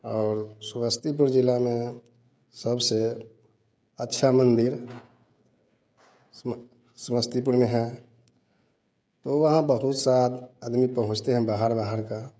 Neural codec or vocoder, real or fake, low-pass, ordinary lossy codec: codec, 16 kHz, 16 kbps, FreqCodec, smaller model; fake; none; none